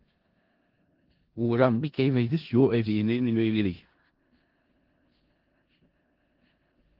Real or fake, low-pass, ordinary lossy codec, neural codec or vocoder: fake; 5.4 kHz; Opus, 16 kbps; codec, 16 kHz in and 24 kHz out, 0.4 kbps, LongCat-Audio-Codec, four codebook decoder